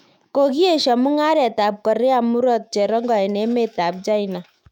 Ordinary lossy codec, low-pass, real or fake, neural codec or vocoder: none; 19.8 kHz; fake; autoencoder, 48 kHz, 128 numbers a frame, DAC-VAE, trained on Japanese speech